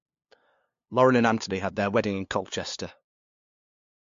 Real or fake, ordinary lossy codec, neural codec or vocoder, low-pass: fake; MP3, 48 kbps; codec, 16 kHz, 2 kbps, FunCodec, trained on LibriTTS, 25 frames a second; 7.2 kHz